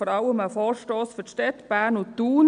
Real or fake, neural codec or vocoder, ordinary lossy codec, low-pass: real; none; MP3, 64 kbps; 9.9 kHz